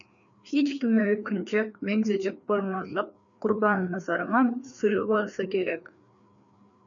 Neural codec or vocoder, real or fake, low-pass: codec, 16 kHz, 2 kbps, FreqCodec, larger model; fake; 7.2 kHz